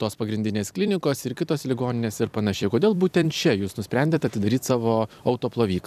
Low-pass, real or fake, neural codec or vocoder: 14.4 kHz; fake; vocoder, 44.1 kHz, 128 mel bands every 256 samples, BigVGAN v2